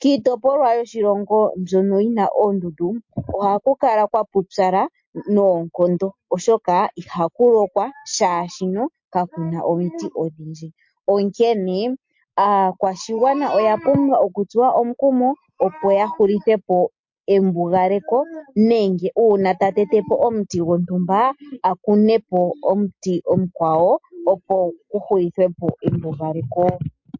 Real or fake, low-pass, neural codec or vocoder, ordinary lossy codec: real; 7.2 kHz; none; MP3, 48 kbps